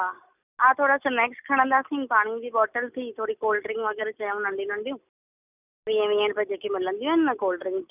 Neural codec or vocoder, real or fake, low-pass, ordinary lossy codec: none; real; 3.6 kHz; none